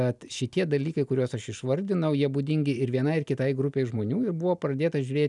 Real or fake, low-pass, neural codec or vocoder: real; 10.8 kHz; none